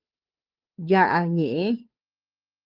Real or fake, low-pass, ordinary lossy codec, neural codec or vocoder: fake; 5.4 kHz; Opus, 24 kbps; codec, 16 kHz, 2 kbps, FunCodec, trained on Chinese and English, 25 frames a second